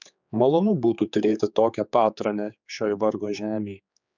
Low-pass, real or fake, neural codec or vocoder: 7.2 kHz; fake; codec, 16 kHz, 4 kbps, X-Codec, HuBERT features, trained on general audio